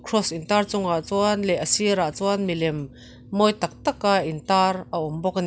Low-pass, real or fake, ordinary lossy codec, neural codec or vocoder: none; real; none; none